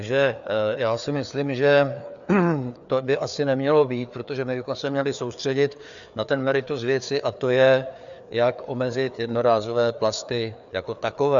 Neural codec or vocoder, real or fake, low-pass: codec, 16 kHz, 4 kbps, FreqCodec, larger model; fake; 7.2 kHz